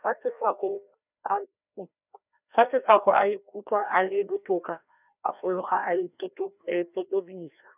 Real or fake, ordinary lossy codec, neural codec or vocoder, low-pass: fake; none; codec, 16 kHz, 1 kbps, FreqCodec, larger model; 3.6 kHz